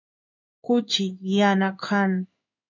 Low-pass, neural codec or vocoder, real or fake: 7.2 kHz; codec, 16 kHz in and 24 kHz out, 1 kbps, XY-Tokenizer; fake